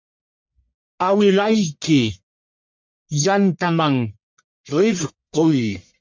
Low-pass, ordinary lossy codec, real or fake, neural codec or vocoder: 7.2 kHz; MP3, 64 kbps; fake; codec, 44.1 kHz, 1.7 kbps, Pupu-Codec